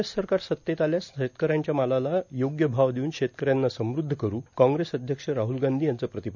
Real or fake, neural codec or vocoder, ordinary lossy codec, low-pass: real; none; none; 7.2 kHz